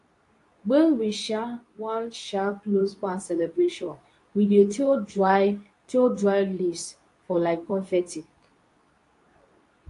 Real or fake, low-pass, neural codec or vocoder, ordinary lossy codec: fake; 10.8 kHz; codec, 24 kHz, 0.9 kbps, WavTokenizer, medium speech release version 2; AAC, 48 kbps